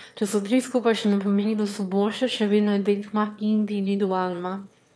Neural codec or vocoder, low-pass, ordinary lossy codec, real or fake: autoencoder, 22.05 kHz, a latent of 192 numbers a frame, VITS, trained on one speaker; none; none; fake